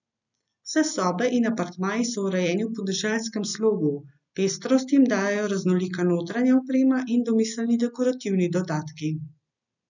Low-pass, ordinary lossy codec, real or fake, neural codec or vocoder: 7.2 kHz; none; real; none